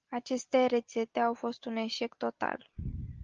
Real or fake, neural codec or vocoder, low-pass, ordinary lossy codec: real; none; 7.2 kHz; Opus, 24 kbps